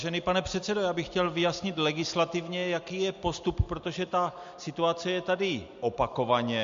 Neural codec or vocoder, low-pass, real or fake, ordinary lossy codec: none; 7.2 kHz; real; MP3, 48 kbps